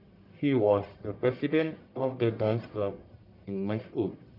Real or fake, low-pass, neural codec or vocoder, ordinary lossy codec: fake; 5.4 kHz; codec, 44.1 kHz, 1.7 kbps, Pupu-Codec; none